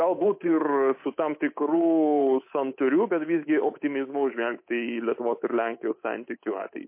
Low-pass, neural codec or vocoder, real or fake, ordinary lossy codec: 3.6 kHz; codec, 24 kHz, 3.1 kbps, DualCodec; fake; MP3, 24 kbps